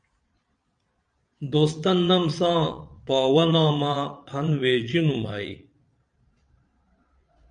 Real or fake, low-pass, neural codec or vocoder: fake; 9.9 kHz; vocoder, 22.05 kHz, 80 mel bands, Vocos